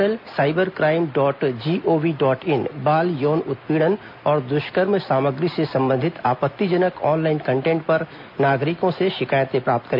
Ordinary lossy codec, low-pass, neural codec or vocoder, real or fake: MP3, 48 kbps; 5.4 kHz; none; real